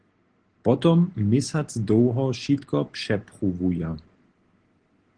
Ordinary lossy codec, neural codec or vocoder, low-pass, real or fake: Opus, 16 kbps; none; 9.9 kHz; real